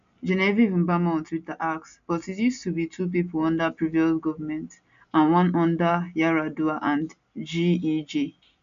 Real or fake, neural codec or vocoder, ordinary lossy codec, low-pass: real; none; AAC, 64 kbps; 7.2 kHz